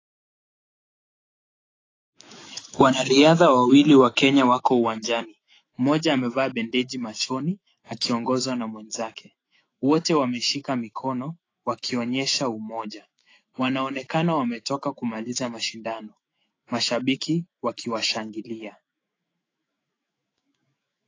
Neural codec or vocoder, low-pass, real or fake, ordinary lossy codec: autoencoder, 48 kHz, 128 numbers a frame, DAC-VAE, trained on Japanese speech; 7.2 kHz; fake; AAC, 32 kbps